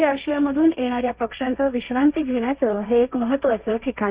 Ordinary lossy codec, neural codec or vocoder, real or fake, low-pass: Opus, 64 kbps; codec, 16 kHz, 1.1 kbps, Voila-Tokenizer; fake; 3.6 kHz